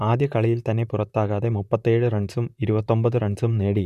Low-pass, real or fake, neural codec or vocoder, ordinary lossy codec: 14.4 kHz; fake; vocoder, 44.1 kHz, 128 mel bands, Pupu-Vocoder; none